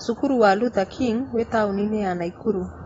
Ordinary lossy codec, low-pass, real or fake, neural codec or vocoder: AAC, 24 kbps; 19.8 kHz; real; none